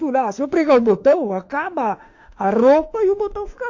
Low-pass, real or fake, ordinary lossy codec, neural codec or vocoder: 7.2 kHz; fake; MP3, 48 kbps; autoencoder, 48 kHz, 32 numbers a frame, DAC-VAE, trained on Japanese speech